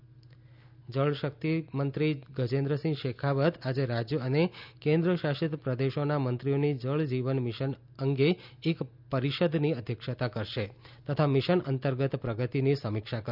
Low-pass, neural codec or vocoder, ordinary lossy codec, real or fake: 5.4 kHz; none; none; real